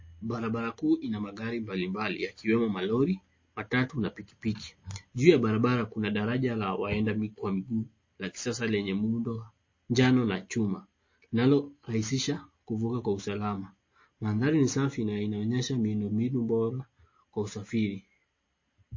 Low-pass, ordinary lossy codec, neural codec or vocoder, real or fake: 7.2 kHz; MP3, 32 kbps; none; real